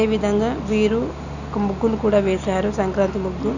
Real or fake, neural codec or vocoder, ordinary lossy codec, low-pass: real; none; none; 7.2 kHz